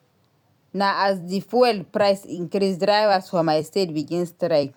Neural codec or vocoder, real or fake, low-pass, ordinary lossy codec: vocoder, 44.1 kHz, 128 mel bands every 256 samples, BigVGAN v2; fake; 19.8 kHz; none